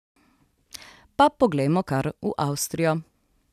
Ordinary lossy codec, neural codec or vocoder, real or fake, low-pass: none; none; real; 14.4 kHz